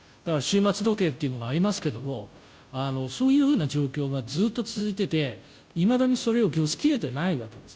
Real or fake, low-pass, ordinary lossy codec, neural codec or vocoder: fake; none; none; codec, 16 kHz, 0.5 kbps, FunCodec, trained on Chinese and English, 25 frames a second